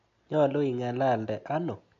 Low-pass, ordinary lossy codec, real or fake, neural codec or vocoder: 7.2 kHz; MP3, 48 kbps; real; none